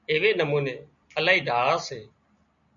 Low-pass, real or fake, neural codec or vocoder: 7.2 kHz; real; none